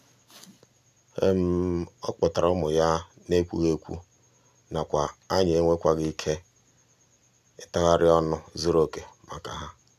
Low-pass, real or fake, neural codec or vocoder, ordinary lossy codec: 14.4 kHz; real; none; none